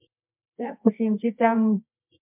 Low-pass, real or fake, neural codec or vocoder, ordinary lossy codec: 3.6 kHz; fake; codec, 24 kHz, 0.9 kbps, WavTokenizer, medium music audio release; MP3, 32 kbps